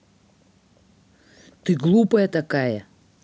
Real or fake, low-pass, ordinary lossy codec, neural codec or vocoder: real; none; none; none